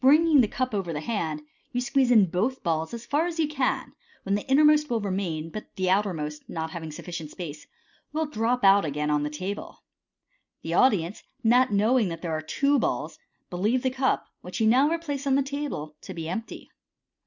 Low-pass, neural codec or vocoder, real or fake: 7.2 kHz; none; real